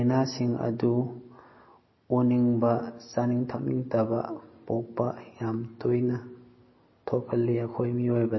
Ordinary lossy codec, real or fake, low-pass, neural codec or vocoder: MP3, 24 kbps; real; 7.2 kHz; none